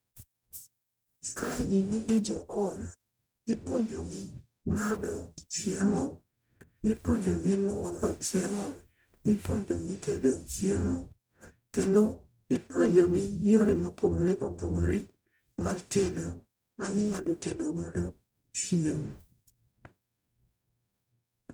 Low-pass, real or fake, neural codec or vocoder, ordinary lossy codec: none; fake; codec, 44.1 kHz, 0.9 kbps, DAC; none